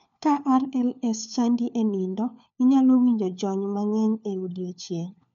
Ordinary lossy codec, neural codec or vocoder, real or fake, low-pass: MP3, 96 kbps; codec, 16 kHz, 4 kbps, FunCodec, trained on LibriTTS, 50 frames a second; fake; 7.2 kHz